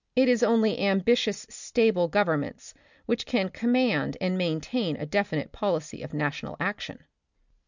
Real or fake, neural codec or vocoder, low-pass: real; none; 7.2 kHz